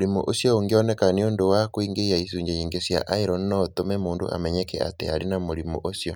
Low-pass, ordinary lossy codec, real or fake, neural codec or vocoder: none; none; real; none